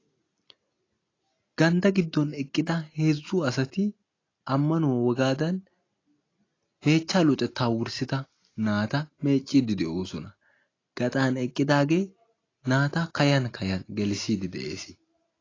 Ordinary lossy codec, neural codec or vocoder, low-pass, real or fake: AAC, 32 kbps; none; 7.2 kHz; real